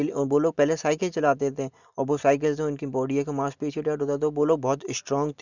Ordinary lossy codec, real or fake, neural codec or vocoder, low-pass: none; real; none; 7.2 kHz